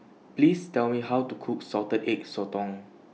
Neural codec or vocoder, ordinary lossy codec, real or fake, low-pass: none; none; real; none